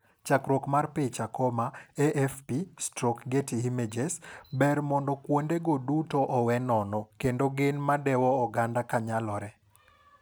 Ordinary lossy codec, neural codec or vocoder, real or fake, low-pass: none; none; real; none